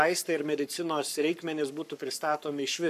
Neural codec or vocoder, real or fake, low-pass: codec, 44.1 kHz, 7.8 kbps, Pupu-Codec; fake; 14.4 kHz